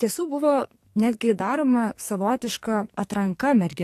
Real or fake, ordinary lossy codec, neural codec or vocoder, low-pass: fake; AAC, 64 kbps; codec, 44.1 kHz, 2.6 kbps, SNAC; 14.4 kHz